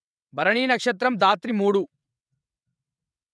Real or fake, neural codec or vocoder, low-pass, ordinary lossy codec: fake; vocoder, 22.05 kHz, 80 mel bands, WaveNeXt; none; none